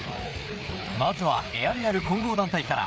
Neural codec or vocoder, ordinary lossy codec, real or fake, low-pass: codec, 16 kHz, 4 kbps, FreqCodec, larger model; none; fake; none